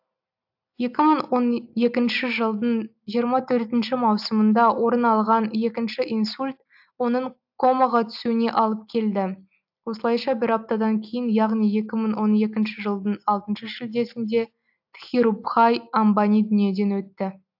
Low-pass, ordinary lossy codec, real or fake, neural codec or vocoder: 5.4 kHz; AAC, 48 kbps; real; none